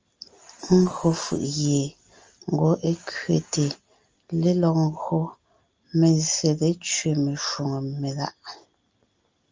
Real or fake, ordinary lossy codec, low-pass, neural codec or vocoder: real; Opus, 24 kbps; 7.2 kHz; none